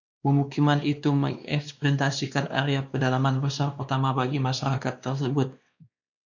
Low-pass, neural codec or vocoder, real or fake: 7.2 kHz; codec, 16 kHz, 2 kbps, X-Codec, WavLM features, trained on Multilingual LibriSpeech; fake